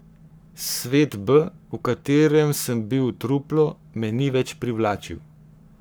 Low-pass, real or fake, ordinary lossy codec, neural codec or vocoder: none; fake; none; codec, 44.1 kHz, 7.8 kbps, Pupu-Codec